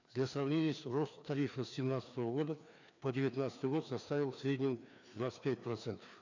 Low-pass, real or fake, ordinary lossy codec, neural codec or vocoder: 7.2 kHz; fake; none; codec, 16 kHz, 2 kbps, FreqCodec, larger model